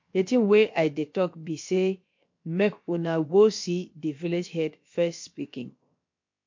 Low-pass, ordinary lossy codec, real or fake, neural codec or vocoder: 7.2 kHz; MP3, 48 kbps; fake; codec, 16 kHz, about 1 kbps, DyCAST, with the encoder's durations